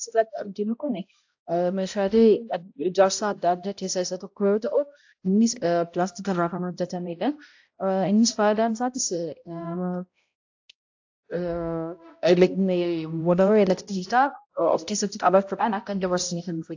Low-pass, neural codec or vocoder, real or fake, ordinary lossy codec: 7.2 kHz; codec, 16 kHz, 0.5 kbps, X-Codec, HuBERT features, trained on balanced general audio; fake; AAC, 48 kbps